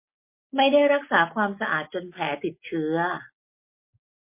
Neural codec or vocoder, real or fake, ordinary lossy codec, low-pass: none; real; MP3, 24 kbps; 3.6 kHz